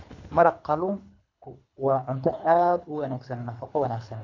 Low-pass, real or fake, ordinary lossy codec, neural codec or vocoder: 7.2 kHz; fake; none; codec, 24 kHz, 3 kbps, HILCodec